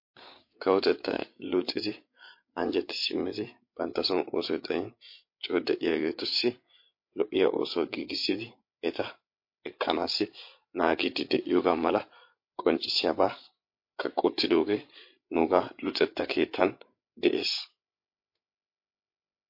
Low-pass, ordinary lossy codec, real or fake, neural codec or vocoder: 5.4 kHz; MP3, 32 kbps; fake; codec, 16 kHz, 8 kbps, FreqCodec, larger model